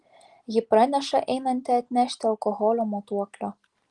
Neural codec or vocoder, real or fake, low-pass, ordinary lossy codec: none; real; 10.8 kHz; Opus, 24 kbps